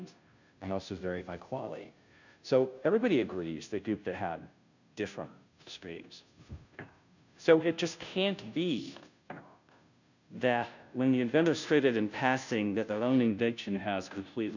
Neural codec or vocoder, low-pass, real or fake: codec, 16 kHz, 0.5 kbps, FunCodec, trained on Chinese and English, 25 frames a second; 7.2 kHz; fake